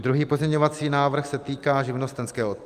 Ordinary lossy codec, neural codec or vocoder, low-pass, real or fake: Opus, 32 kbps; none; 14.4 kHz; real